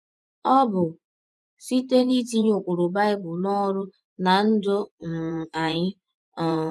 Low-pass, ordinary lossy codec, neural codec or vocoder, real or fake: none; none; vocoder, 24 kHz, 100 mel bands, Vocos; fake